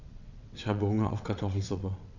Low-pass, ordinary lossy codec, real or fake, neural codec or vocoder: 7.2 kHz; none; fake; vocoder, 22.05 kHz, 80 mel bands, WaveNeXt